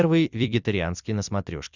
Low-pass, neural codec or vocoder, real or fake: 7.2 kHz; vocoder, 44.1 kHz, 128 mel bands every 256 samples, BigVGAN v2; fake